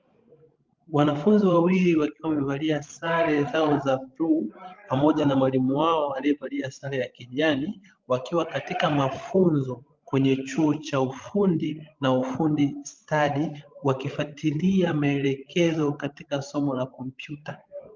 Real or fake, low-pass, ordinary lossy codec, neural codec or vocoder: fake; 7.2 kHz; Opus, 24 kbps; codec, 16 kHz, 16 kbps, FreqCodec, larger model